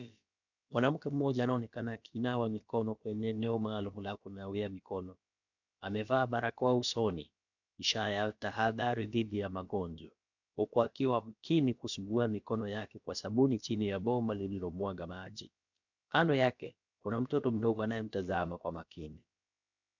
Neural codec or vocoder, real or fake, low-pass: codec, 16 kHz, about 1 kbps, DyCAST, with the encoder's durations; fake; 7.2 kHz